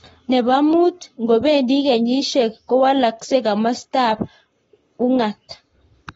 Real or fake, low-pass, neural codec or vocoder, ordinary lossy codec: fake; 19.8 kHz; codec, 44.1 kHz, 7.8 kbps, Pupu-Codec; AAC, 24 kbps